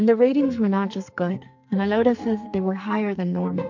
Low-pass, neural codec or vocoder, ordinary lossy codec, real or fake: 7.2 kHz; codec, 44.1 kHz, 2.6 kbps, SNAC; MP3, 64 kbps; fake